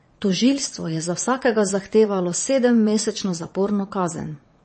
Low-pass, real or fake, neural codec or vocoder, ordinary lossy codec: 9.9 kHz; fake; vocoder, 22.05 kHz, 80 mel bands, WaveNeXt; MP3, 32 kbps